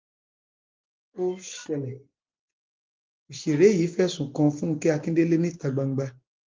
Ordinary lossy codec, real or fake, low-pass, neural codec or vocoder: Opus, 32 kbps; real; 7.2 kHz; none